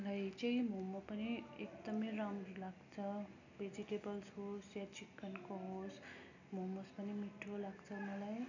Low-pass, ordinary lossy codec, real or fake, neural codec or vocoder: 7.2 kHz; none; real; none